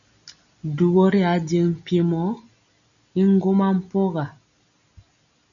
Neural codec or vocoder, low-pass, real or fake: none; 7.2 kHz; real